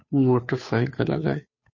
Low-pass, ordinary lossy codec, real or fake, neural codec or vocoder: 7.2 kHz; MP3, 32 kbps; fake; codec, 16 kHz, 2 kbps, FunCodec, trained on Chinese and English, 25 frames a second